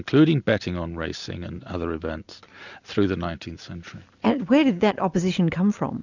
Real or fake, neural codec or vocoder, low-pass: real; none; 7.2 kHz